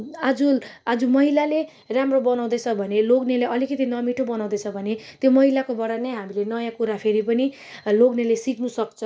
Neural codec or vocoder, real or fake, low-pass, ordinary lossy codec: none; real; none; none